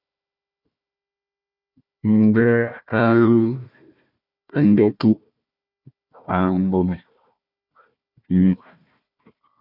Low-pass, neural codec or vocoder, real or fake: 5.4 kHz; codec, 16 kHz, 1 kbps, FunCodec, trained on Chinese and English, 50 frames a second; fake